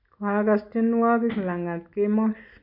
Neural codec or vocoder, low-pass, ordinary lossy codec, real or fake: none; 5.4 kHz; none; real